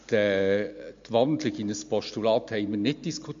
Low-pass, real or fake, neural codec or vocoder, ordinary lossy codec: 7.2 kHz; real; none; MP3, 48 kbps